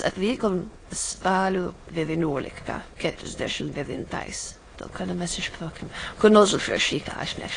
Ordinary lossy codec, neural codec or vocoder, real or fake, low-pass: AAC, 32 kbps; autoencoder, 22.05 kHz, a latent of 192 numbers a frame, VITS, trained on many speakers; fake; 9.9 kHz